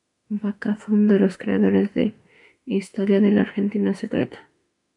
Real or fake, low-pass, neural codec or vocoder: fake; 10.8 kHz; autoencoder, 48 kHz, 32 numbers a frame, DAC-VAE, trained on Japanese speech